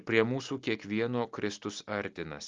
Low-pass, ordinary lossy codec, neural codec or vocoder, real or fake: 7.2 kHz; Opus, 32 kbps; none; real